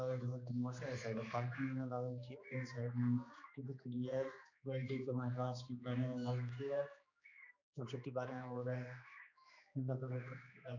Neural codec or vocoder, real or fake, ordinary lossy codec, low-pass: codec, 16 kHz, 2 kbps, X-Codec, HuBERT features, trained on general audio; fake; MP3, 64 kbps; 7.2 kHz